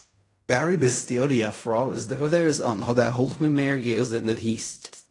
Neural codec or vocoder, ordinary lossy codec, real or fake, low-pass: codec, 16 kHz in and 24 kHz out, 0.4 kbps, LongCat-Audio-Codec, fine tuned four codebook decoder; AAC, 48 kbps; fake; 10.8 kHz